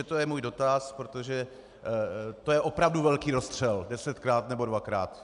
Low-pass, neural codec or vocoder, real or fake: 10.8 kHz; none; real